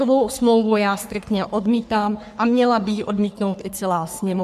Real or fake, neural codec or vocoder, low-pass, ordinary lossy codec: fake; codec, 44.1 kHz, 3.4 kbps, Pupu-Codec; 14.4 kHz; MP3, 96 kbps